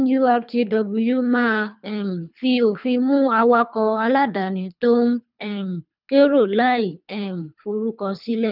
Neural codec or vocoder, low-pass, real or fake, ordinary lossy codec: codec, 24 kHz, 3 kbps, HILCodec; 5.4 kHz; fake; none